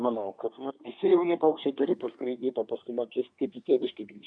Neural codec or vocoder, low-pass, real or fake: codec, 24 kHz, 1 kbps, SNAC; 9.9 kHz; fake